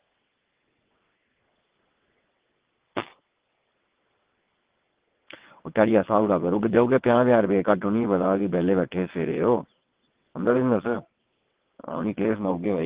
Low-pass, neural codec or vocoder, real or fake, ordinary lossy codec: 3.6 kHz; vocoder, 22.05 kHz, 80 mel bands, WaveNeXt; fake; Opus, 16 kbps